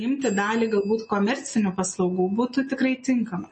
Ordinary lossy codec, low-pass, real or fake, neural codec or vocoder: MP3, 32 kbps; 10.8 kHz; real; none